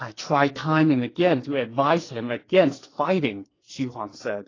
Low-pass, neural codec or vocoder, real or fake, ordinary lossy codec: 7.2 kHz; codec, 44.1 kHz, 2.6 kbps, SNAC; fake; AAC, 32 kbps